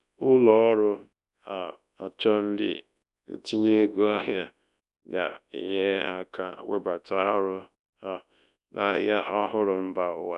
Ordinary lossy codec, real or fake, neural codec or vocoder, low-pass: none; fake; codec, 24 kHz, 0.9 kbps, WavTokenizer, large speech release; 10.8 kHz